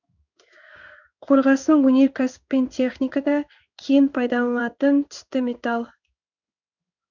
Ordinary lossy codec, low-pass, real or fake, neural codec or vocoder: none; 7.2 kHz; fake; codec, 16 kHz in and 24 kHz out, 1 kbps, XY-Tokenizer